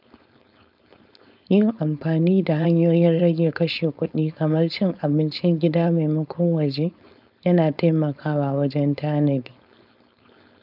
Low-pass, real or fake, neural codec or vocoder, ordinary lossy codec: 5.4 kHz; fake; codec, 16 kHz, 4.8 kbps, FACodec; none